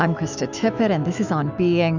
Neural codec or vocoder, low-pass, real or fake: autoencoder, 48 kHz, 128 numbers a frame, DAC-VAE, trained on Japanese speech; 7.2 kHz; fake